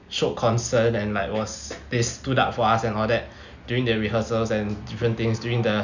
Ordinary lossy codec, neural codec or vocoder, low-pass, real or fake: none; none; 7.2 kHz; real